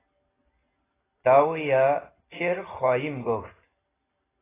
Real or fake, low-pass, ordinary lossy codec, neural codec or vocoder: real; 3.6 kHz; AAC, 16 kbps; none